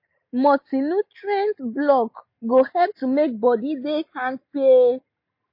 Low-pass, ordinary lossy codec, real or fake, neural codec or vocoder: 5.4 kHz; MP3, 32 kbps; real; none